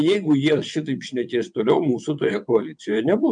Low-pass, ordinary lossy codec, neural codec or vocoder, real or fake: 9.9 kHz; MP3, 64 kbps; vocoder, 22.05 kHz, 80 mel bands, WaveNeXt; fake